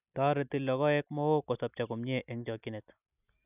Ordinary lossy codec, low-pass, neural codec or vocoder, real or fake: none; 3.6 kHz; none; real